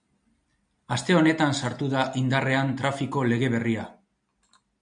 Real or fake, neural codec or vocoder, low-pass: real; none; 9.9 kHz